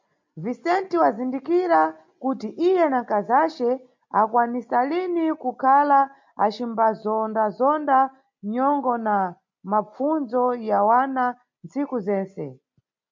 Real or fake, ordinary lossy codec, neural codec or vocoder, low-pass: real; MP3, 48 kbps; none; 7.2 kHz